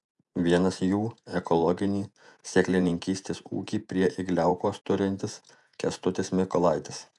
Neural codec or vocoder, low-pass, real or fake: vocoder, 48 kHz, 128 mel bands, Vocos; 10.8 kHz; fake